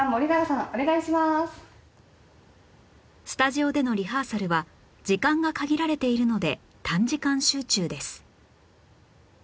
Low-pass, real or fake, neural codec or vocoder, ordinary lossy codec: none; real; none; none